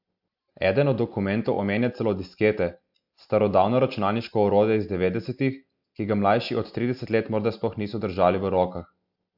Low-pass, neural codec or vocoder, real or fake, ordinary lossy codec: 5.4 kHz; none; real; AAC, 48 kbps